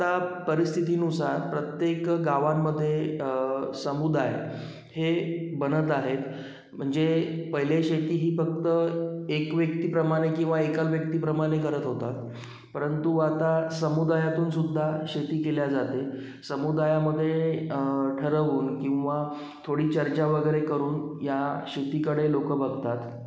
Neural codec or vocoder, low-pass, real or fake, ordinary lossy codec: none; none; real; none